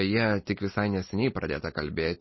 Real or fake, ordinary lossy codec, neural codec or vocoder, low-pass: real; MP3, 24 kbps; none; 7.2 kHz